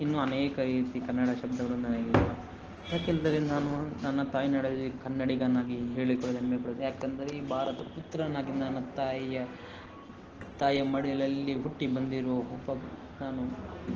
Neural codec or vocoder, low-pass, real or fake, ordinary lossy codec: none; 7.2 kHz; real; Opus, 16 kbps